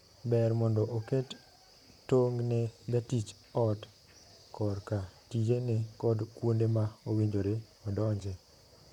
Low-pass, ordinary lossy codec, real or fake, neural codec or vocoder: 19.8 kHz; none; fake; vocoder, 44.1 kHz, 128 mel bands, Pupu-Vocoder